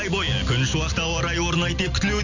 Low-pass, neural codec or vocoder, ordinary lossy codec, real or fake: 7.2 kHz; none; none; real